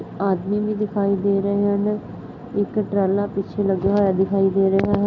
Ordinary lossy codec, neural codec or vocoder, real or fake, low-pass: none; none; real; 7.2 kHz